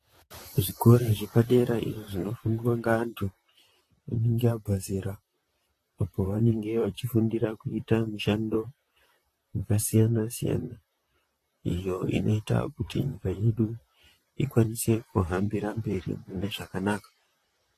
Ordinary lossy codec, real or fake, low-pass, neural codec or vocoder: AAC, 48 kbps; fake; 14.4 kHz; vocoder, 44.1 kHz, 128 mel bands, Pupu-Vocoder